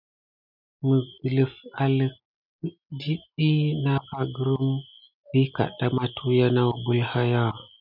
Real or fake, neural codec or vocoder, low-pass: real; none; 5.4 kHz